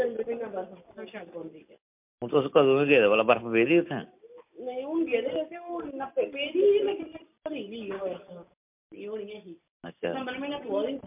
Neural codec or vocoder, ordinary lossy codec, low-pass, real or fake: none; MP3, 32 kbps; 3.6 kHz; real